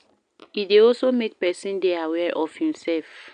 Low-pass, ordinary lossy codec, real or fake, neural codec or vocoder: 9.9 kHz; none; real; none